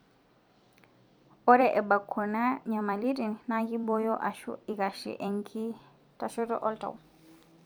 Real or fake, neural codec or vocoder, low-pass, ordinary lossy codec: fake; vocoder, 44.1 kHz, 128 mel bands every 256 samples, BigVGAN v2; none; none